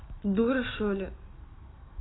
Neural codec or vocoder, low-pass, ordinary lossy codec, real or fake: none; 7.2 kHz; AAC, 16 kbps; real